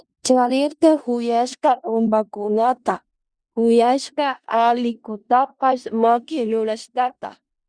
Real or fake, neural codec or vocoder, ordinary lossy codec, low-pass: fake; codec, 16 kHz in and 24 kHz out, 0.4 kbps, LongCat-Audio-Codec, four codebook decoder; Opus, 64 kbps; 9.9 kHz